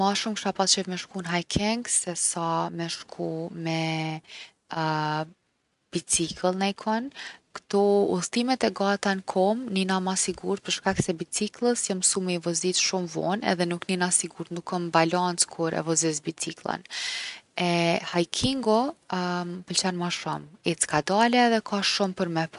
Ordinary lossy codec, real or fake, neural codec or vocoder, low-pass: none; real; none; 10.8 kHz